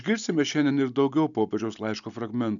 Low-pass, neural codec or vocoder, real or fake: 7.2 kHz; none; real